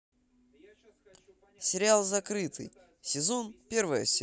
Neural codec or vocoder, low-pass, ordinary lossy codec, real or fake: none; none; none; real